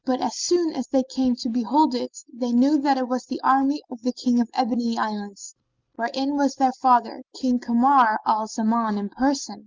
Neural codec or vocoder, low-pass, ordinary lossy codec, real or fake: none; 7.2 kHz; Opus, 24 kbps; real